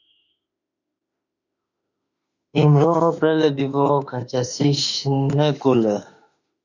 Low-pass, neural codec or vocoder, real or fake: 7.2 kHz; autoencoder, 48 kHz, 32 numbers a frame, DAC-VAE, trained on Japanese speech; fake